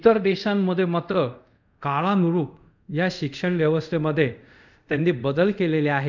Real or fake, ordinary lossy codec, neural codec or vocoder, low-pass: fake; none; codec, 24 kHz, 0.5 kbps, DualCodec; 7.2 kHz